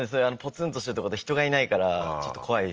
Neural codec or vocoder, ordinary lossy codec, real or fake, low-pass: none; Opus, 24 kbps; real; 7.2 kHz